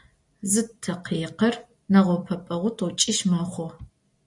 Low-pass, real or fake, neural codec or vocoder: 10.8 kHz; real; none